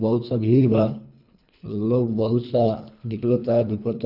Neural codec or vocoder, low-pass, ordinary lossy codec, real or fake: codec, 24 kHz, 3 kbps, HILCodec; 5.4 kHz; none; fake